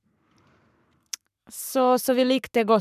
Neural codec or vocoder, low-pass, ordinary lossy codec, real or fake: codec, 44.1 kHz, 7.8 kbps, Pupu-Codec; 14.4 kHz; none; fake